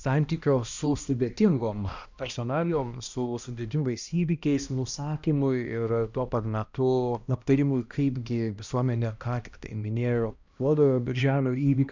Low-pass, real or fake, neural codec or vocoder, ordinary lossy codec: 7.2 kHz; fake; codec, 16 kHz, 1 kbps, X-Codec, HuBERT features, trained on balanced general audio; Opus, 64 kbps